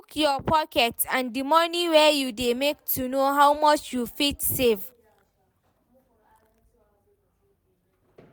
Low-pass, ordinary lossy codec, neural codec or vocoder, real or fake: none; none; none; real